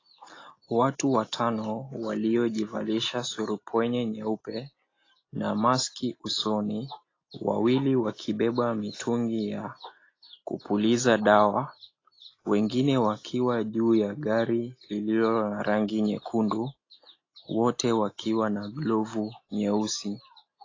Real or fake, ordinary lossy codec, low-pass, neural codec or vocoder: real; AAC, 32 kbps; 7.2 kHz; none